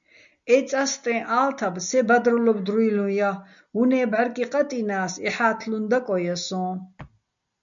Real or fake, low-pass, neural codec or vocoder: real; 7.2 kHz; none